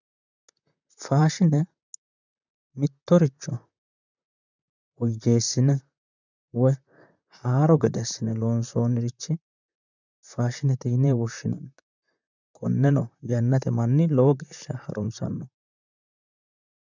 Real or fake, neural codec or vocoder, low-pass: fake; vocoder, 44.1 kHz, 128 mel bands, Pupu-Vocoder; 7.2 kHz